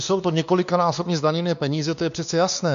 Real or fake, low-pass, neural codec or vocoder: fake; 7.2 kHz; codec, 16 kHz, 2 kbps, X-Codec, WavLM features, trained on Multilingual LibriSpeech